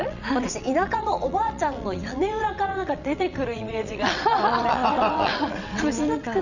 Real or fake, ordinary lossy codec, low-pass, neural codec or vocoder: fake; none; 7.2 kHz; vocoder, 22.05 kHz, 80 mel bands, WaveNeXt